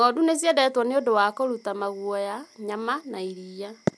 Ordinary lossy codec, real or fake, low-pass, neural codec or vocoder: none; real; none; none